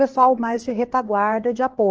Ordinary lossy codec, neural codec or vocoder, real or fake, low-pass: Opus, 16 kbps; codec, 16 kHz, 2 kbps, X-Codec, HuBERT features, trained on LibriSpeech; fake; 7.2 kHz